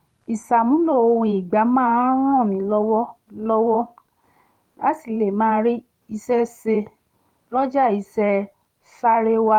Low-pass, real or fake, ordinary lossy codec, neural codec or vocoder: 19.8 kHz; fake; Opus, 32 kbps; vocoder, 44.1 kHz, 128 mel bands every 512 samples, BigVGAN v2